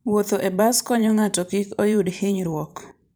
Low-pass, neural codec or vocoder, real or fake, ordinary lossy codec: none; vocoder, 44.1 kHz, 128 mel bands every 512 samples, BigVGAN v2; fake; none